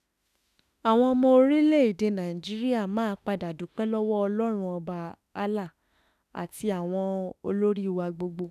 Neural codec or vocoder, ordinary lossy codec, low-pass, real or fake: autoencoder, 48 kHz, 32 numbers a frame, DAC-VAE, trained on Japanese speech; none; 14.4 kHz; fake